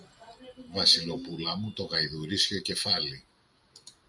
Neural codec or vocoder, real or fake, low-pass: none; real; 10.8 kHz